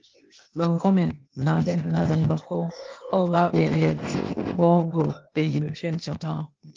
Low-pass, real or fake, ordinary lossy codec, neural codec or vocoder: 7.2 kHz; fake; Opus, 24 kbps; codec, 16 kHz, 0.8 kbps, ZipCodec